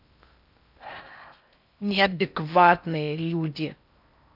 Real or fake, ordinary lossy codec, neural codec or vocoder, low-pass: fake; none; codec, 16 kHz in and 24 kHz out, 0.6 kbps, FocalCodec, streaming, 4096 codes; 5.4 kHz